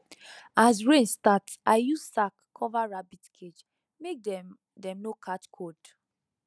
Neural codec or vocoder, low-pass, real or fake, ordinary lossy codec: none; none; real; none